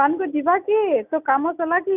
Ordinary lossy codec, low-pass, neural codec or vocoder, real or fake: none; 3.6 kHz; none; real